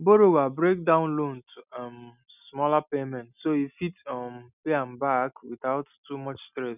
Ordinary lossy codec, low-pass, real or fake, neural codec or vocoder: none; 3.6 kHz; real; none